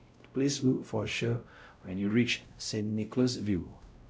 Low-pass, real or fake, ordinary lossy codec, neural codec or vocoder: none; fake; none; codec, 16 kHz, 0.5 kbps, X-Codec, WavLM features, trained on Multilingual LibriSpeech